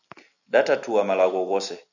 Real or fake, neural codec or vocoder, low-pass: real; none; 7.2 kHz